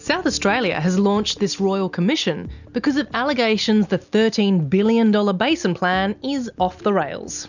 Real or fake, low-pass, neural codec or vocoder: real; 7.2 kHz; none